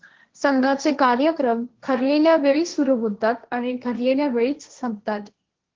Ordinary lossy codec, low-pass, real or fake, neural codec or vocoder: Opus, 16 kbps; 7.2 kHz; fake; codec, 16 kHz, 1.1 kbps, Voila-Tokenizer